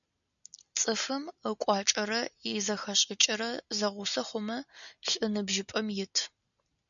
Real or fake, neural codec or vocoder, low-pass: real; none; 7.2 kHz